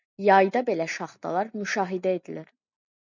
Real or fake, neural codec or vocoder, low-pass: real; none; 7.2 kHz